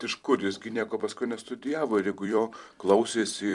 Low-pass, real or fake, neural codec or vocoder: 10.8 kHz; fake; vocoder, 44.1 kHz, 128 mel bands every 256 samples, BigVGAN v2